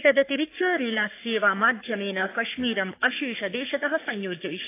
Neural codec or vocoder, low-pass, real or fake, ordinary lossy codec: codec, 44.1 kHz, 3.4 kbps, Pupu-Codec; 3.6 kHz; fake; AAC, 24 kbps